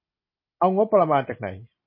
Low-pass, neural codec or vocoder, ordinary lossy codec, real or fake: 5.4 kHz; none; MP3, 24 kbps; real